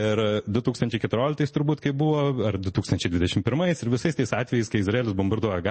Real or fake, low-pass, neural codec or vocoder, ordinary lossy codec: real; 10.8 kHz; none; MP3, 32 kbps